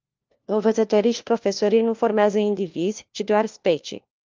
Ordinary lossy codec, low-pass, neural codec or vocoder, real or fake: Opus, 24 kbps; 7.2 kHz; codec, 16 kHz, 1 kbps, FunCodec, trained on LibriTTS, 50 frames a second; fake